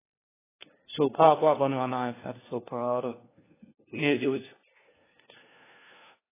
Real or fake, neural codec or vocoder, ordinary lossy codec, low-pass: fake; codec, 16 kHz, 1 kbps, FunCodec, trained on LibriTTS, 50 frames a second; AAC, 16 kbps; 3.6 kHz